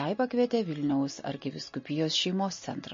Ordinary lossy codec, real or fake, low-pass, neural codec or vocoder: MP3, 32 kbps; real; 7.2 kHz; none